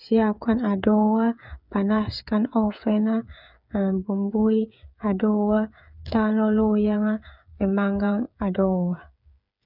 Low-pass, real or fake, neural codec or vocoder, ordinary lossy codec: 5.4 kHz; fake; codec, 16 kHz, 8 kbps, FreqCodec, smaller model; none